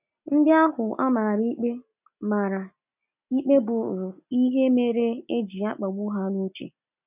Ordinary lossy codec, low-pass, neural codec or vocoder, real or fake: none; 3.6 kHz; none; real